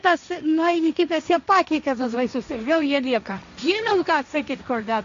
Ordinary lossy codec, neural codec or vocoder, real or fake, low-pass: MP3, 64 kbps; codec, 16 kHz, 1.1 kbps, Voila-Tokenizer; fake; 7.2 kHz